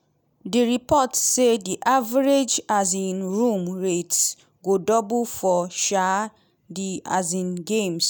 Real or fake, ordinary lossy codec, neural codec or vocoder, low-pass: real; none; none; none